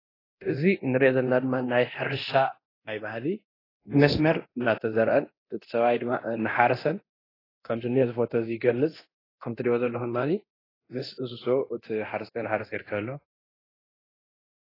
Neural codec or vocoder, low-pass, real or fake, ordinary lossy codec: codec, 24 kHz, 0.9 kbps, DualCodec; 5.4 kHz; fake; AAC, 24 kbps